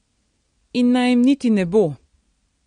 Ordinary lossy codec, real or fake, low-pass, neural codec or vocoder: MP3, 48 kbps; real; 9.9 kHz; none